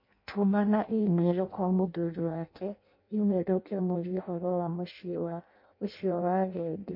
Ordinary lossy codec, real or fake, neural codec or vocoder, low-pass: MP3, 32 kbps; fake; codec, 16 kHz in and 24 kHz out, 0.6 kbps, FireRedTTS-2 codec; 5.4 kHz